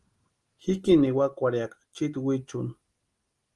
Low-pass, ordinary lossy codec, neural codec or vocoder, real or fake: 10.8 kHz; Opus, 24 kbps; none; real